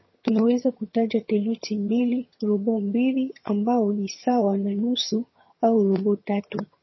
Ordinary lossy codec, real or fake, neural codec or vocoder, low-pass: MP3, 24 kbps; fake; vocoder, 22.05 kHz, 80 mel bands, HiFi-GAN; 7.2 kHz